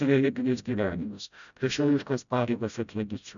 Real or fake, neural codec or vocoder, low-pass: fake; codec, 16 kHz, 0.5 kbps, FreqCodec, smaller model; 7.2 kHz